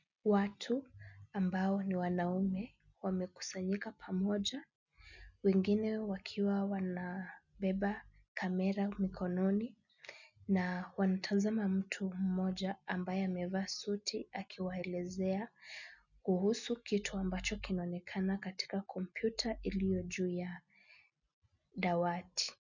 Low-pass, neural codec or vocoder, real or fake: 7.2 kHz; none; real